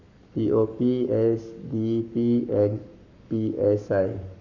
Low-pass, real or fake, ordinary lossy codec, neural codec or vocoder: 7.2 kHz; fake; none; codec, 44.1 kHz, 7.8 kbps, Pupu-Codec